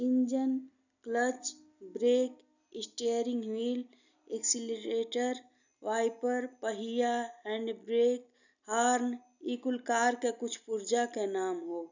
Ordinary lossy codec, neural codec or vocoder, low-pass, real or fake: none; none; 7.2 kHz; real